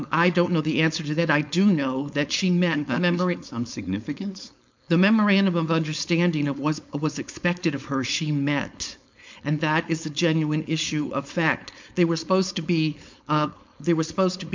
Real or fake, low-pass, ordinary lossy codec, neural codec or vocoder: fake; 7.2 kHz; MP3, 64 kbps; codec, 16 kHz, 4.8 kbps, FACodec